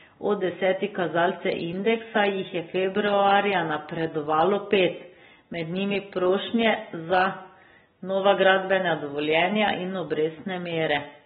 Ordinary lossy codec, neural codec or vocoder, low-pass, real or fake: AAC, 16 kbps; none; 7.2 kHz; real